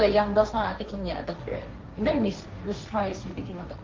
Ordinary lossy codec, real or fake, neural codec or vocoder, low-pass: Opus, 32 kbps; fake; codec, 16 kHz, 1.1 kbps, Voila-Tokenizer; 7.2 kHz